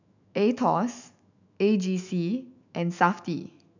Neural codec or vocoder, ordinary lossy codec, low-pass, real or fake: autoencoder, 48 kHz, 128 numbers a frame, DAC-VAE, trained on Japanese speech; none; 7.2 kHz; fake